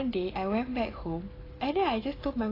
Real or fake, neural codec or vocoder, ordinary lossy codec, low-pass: real; none; AAC, 24 kbps; 5.4 kHz